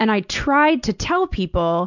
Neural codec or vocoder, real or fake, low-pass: none; real; 7.2 kHz